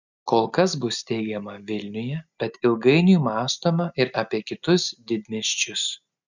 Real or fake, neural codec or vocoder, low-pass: real; none; 7.2 kHz